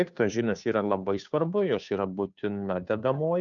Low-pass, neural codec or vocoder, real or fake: 7.2 kHz; codec, 16 kHz, 2 kbps, FunCodec, trained on Chinese and English, 25 frames a second; fake